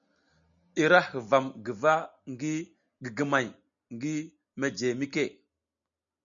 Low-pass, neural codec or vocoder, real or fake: 7.2 kHz; none; real